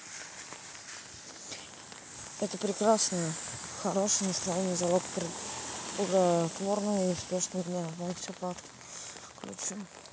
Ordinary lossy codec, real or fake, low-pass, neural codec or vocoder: none; real; none; none